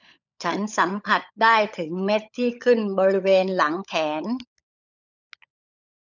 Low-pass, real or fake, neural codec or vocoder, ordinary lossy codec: 7.2 kHz; fake; codec, 16 kHz, 16 kbps, FunCodec, trained on LibriTTS, 50 frames a second; none